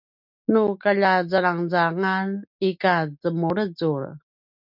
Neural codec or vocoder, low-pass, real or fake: none; 5.4 kHz; real